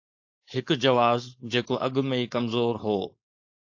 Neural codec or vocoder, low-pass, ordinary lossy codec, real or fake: codec, 16 kHz, 4.8 kbps, FACodec; 7.2 kHz; AAC, 48 kbps; fake